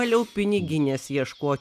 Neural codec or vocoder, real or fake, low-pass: none; real; 14.4 kHz